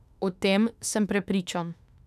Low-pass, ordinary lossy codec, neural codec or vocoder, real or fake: 14.4 kHz; none; autoencoder, 48 kHz, 32 numbers a frame, DAC-VAE, trained on Japanese speech; fake